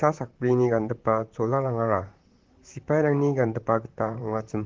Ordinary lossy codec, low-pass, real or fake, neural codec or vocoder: Opus, 16 kbps; 7.2 kHz; fake; vocoder, 44.1 kHz, 128 mel bands, Pupu-Vocoder